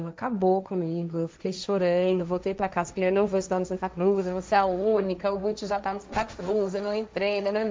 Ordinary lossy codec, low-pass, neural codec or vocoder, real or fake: none; none; codec, 16 kHz, 1.1 kbps, Voila-Tokenizer; fake